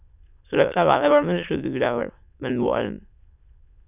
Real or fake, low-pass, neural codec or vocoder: fake; 3.6 kHz; autoencoder, 22.05 kHz, a latent of 192 numbers a frame, VITS, trained on many speakers